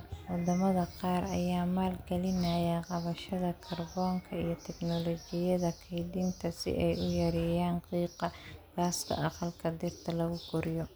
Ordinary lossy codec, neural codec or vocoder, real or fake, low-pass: none; none; real; none